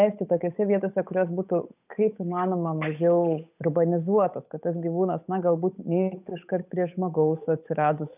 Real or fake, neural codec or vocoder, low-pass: fake; codec, 16 kHz, 8 kbps, FunCodec, trained on Chinese and English, 25 frames a second; 3.6 kHz